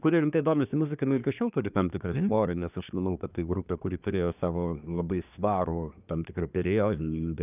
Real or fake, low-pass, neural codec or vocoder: fake; 3.6 kHz; codec, 24 kHz, 1 kbps, SNAC